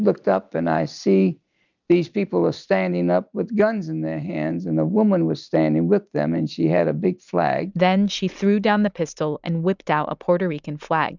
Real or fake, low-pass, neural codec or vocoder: real; 7.2 kHz; none